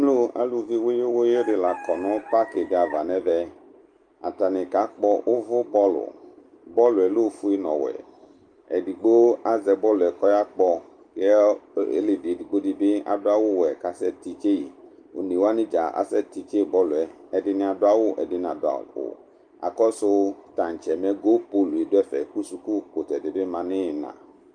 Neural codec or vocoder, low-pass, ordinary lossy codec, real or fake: none; 9.9 kHz; Opus, 32 kbps; real